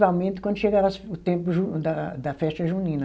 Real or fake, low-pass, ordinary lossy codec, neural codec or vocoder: real; none; none; none